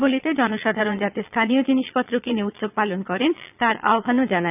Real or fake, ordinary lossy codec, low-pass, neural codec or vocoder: fake; none; 3.6 kHz; vocoder, 22.05 kHz, 80 mel bands, Vocos